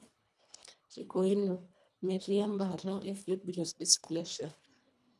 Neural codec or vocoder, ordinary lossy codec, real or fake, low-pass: codec, 24 kHz, 1.5 kbps, HILCodec; none; fake; none